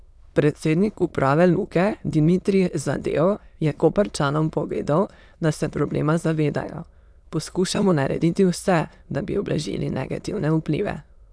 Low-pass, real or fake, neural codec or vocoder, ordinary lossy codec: none; fake; autoencoder, 22.05 kHz, a latent of 192 numbers a frame, VITS, trained on many speakers; none